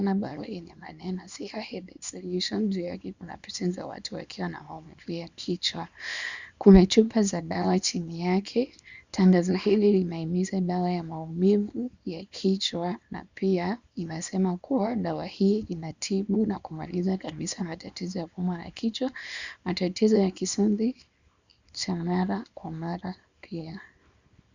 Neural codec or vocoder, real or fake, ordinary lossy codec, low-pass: codec, 24 kHz, 0.9 kbps, WavTokenizer, small release; fake; Opus, 64 kbps; 7.2 kHz